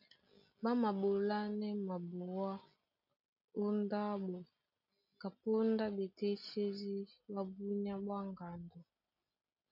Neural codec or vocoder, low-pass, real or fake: none; 5.4 kHz; real